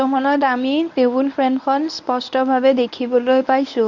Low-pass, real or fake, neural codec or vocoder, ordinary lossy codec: 7.2 kHz; fake; codec, 24 kHz, 0.9 kbps, WavTokenizer, medium speech release version 1; none